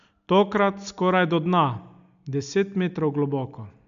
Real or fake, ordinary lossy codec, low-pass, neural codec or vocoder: real; MP3, 64 kbps; 7.2 kHz; none